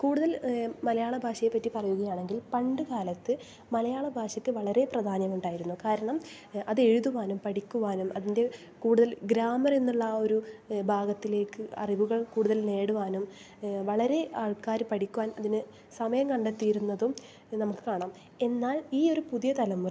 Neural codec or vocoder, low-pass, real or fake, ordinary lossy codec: none; none; real; none